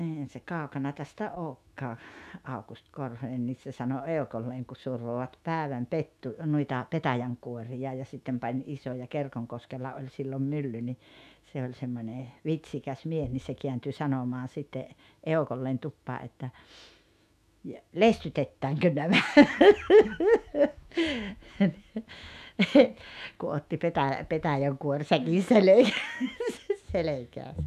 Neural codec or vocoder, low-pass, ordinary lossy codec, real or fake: autoencoder, 48 kHz, 128 numbers a frame, DAC-VAE, trained on Japanese speech; 14.4 kHz; none; fake